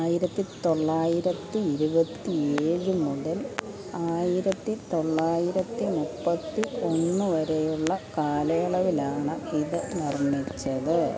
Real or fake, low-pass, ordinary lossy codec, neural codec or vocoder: real; none; none; none